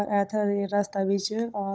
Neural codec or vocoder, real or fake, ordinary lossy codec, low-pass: codec, 16 kHz, 16 kbps, FunCodec, trained on Chinese and English, 50 frames a second; fake; none; none